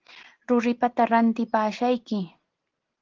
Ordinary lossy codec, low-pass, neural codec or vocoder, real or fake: Opus, 16 kbps; 7.2 kHz; none; real